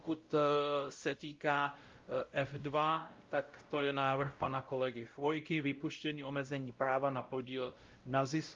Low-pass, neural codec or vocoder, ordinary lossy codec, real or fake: 7.2 kHz; codec, 16 kHz, 0.5 kbps, X-Codec, WavLM features, trained on Multilingual LibriSpeech; Opus, 32 kbps; fake